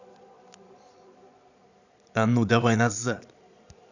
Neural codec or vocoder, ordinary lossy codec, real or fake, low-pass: vocoder, 22.05 kHz, 80 mel bands, Vocos; none; fake; 7.2 kHz